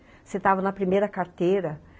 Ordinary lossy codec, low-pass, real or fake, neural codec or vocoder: none; none; real; none